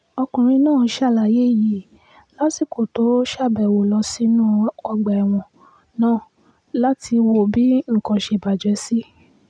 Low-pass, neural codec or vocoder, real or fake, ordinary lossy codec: 9.9 kHz; none; real; none